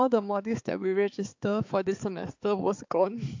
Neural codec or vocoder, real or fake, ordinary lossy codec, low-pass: codec, 16 kHz, 4 kbps, X-Codec, HuBERT features, trained on balanced general audio; fake; AAC, 48 kbps; 7.2 kHz